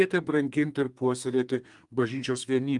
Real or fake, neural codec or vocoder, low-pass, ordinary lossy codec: fake; codec, 32 kHz, 1.9 kbps, SNAC; 10.8 kHz; Opus, 32 kbps